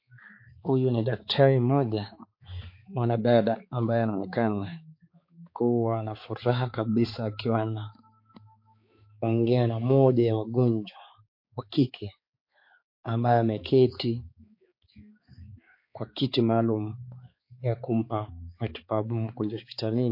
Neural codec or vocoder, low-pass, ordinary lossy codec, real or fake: codec, 16 kHz, 4 kbps, X-Codec, HuBERT features, trained on balanced general audio; 5.4 kHz; MP3, 32 kbps; fake